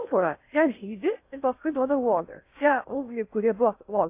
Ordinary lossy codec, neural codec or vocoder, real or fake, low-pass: AAC, 24 kbps; codec, 16 kHz in and 24 kHz out, 0.6 kbps, FocalCodec, streaming, 4096 codes; fake; 3.6 kHz